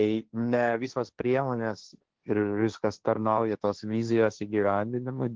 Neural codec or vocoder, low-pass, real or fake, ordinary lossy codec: codec, 16 kHz, 1.1 kbps, Voila-Tokenizer; 7.2 kHz; fake; Opus, 16 kbps